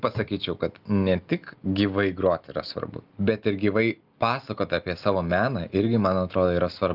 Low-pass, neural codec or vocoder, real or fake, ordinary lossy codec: 5.4 kHz; none; real; Opus, 32 kbps